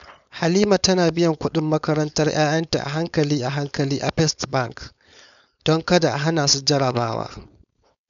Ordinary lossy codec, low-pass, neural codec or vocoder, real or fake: none; 7.2 kHz; codec, 16 kHz, 4.8 kbps, FACodec; fake